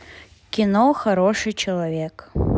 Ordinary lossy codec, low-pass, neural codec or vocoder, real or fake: none; none; none; real